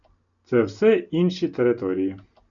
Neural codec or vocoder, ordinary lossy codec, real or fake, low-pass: none; MP3, 96 kbps; real; 7.2 kHz